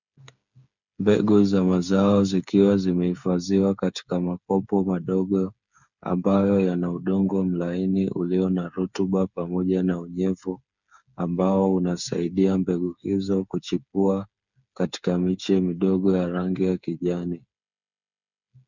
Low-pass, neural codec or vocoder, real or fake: 7.2 kHz; codec, 16 kHz, 8 kbps, FreqCodec, smaller model; fake